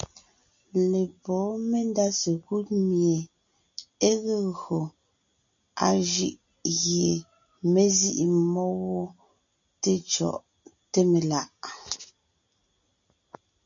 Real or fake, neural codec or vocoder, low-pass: real; none; 7.2 kHz